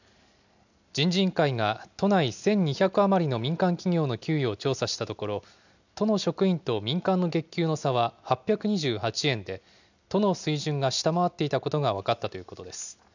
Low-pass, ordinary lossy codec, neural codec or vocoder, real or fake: 7.2 kHz; none; none; real